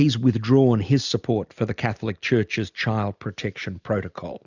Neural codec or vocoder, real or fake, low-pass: none; real; 7.2 kHz